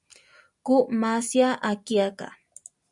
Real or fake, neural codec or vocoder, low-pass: real; none; 10.8 kHz